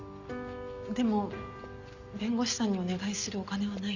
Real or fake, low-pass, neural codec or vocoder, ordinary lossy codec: real; 7.2 kHz; none; none